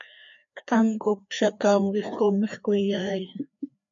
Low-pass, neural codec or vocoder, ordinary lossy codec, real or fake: 7.2 kHz; codec, 16 kHz, 2 kbps, FreqCodec, larger model; MP3, 64 kbps; fake